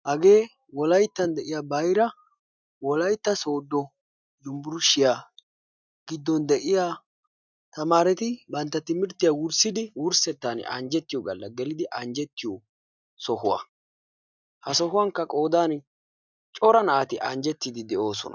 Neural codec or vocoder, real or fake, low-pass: none; real; 7.2 kHz